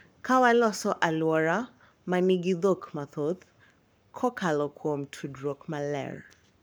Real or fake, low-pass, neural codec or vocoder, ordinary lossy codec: fake; none; codec, 44.1 kHz, 7.8 kbps, Pupu-Codec; none